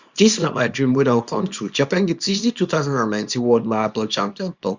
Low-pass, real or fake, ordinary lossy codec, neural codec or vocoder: 7.2 kHz; fake; Opus, 64 kbps; codec, 24 kHz, 0.9 kbps, WavTokenizer, small release